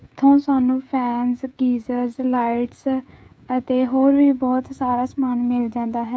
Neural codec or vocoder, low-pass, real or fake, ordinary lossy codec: codec, 16 kHz, 16 kbps, FreqCodec, smaller model; none; fake; none